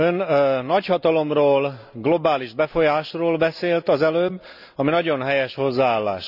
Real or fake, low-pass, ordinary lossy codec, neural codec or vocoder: real; 5.4 kHz; none; none